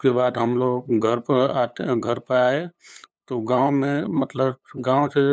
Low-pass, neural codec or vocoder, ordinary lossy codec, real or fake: none; codec, 16 kHz, 8 kbps, FunCodec, trained on LibriTTS, 25 frames a second; none; fake